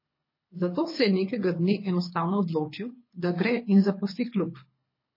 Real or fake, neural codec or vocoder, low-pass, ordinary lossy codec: fake; codec, 24 kHz, 6 kbps, HILCodec; 5.4 kHz; MP3, 24 kbps